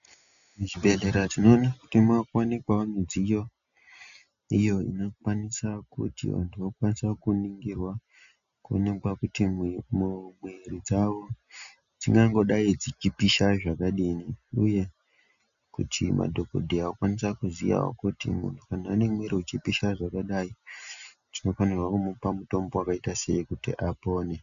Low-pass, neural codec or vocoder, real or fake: 7.2 kHz; none; real